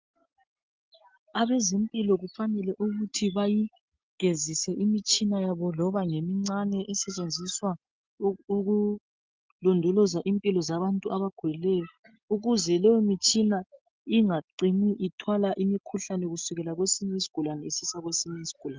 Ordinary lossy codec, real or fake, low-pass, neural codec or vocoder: Opus, 24 kbps; real; 7.2 kHz; none